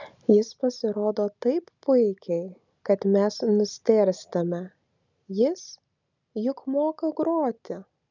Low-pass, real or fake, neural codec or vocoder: 7.2 kHz; real; none